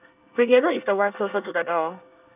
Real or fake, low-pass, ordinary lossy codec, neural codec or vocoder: fake; 3.6 kHz; none; codec, 24 kHz, 1 kbps, SNAC